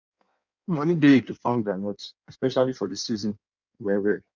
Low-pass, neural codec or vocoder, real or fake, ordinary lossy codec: 7.2 kHz; codec, 16 kHz in and 24 kHz out, 1.1 kbps, FireRedTTS-2 codec; fake; none